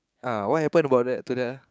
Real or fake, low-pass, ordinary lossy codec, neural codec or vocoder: fake; none; none; codec, 16 kHz, 6 kbps, DAC